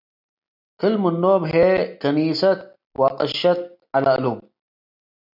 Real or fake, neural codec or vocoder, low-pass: real; none; 5.4 kHz